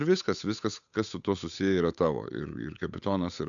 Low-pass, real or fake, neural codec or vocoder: 7.2 kHz; fake; codec, 16 kHz, 8 kbps, FunCodec, trained on Chinese and English, 25 frames a second